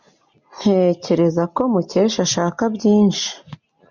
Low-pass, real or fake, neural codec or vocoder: 7.2 kHz; real; none